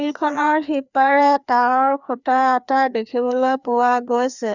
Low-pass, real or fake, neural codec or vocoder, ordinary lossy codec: 7.2 kHz; fake; codec, 16 kHz, 2 kbps, FreqCodec, larger model; none